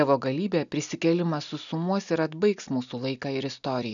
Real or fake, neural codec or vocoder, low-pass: real; none; 7.2 kHz